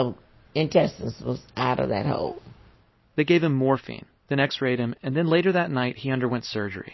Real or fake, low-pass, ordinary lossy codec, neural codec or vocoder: real; 7.2 kHz; MP3, 24 kbps; none